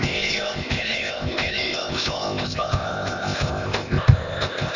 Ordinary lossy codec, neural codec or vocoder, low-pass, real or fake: none; codec, 16 kHz, 0.8 kbps, ZipCodec; 7.2 kHz; fake